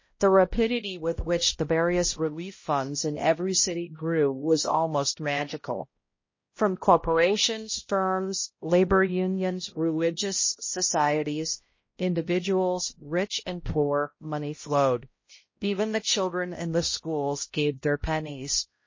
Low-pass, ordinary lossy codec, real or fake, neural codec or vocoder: 7.2 kHz; MP3, 32 kbps; fake; codec, 16 kHz, 0.5 kbps, X-Codec, HuBERT features, trained on balanced general audio